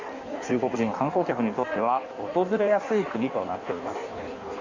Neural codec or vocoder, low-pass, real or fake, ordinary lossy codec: codec, 16 kHz in and 24 kHz out, 1.1 kbps, FireRedTTS-2 codec; 7.2 kHz; fake; Opus, 64 kbps